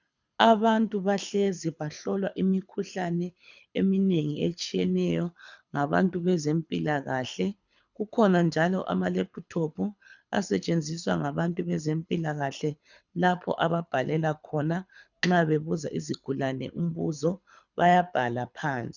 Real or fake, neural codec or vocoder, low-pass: fake; codec, 24 kHz, 6 kbps, HILCodec; 7.2 kHz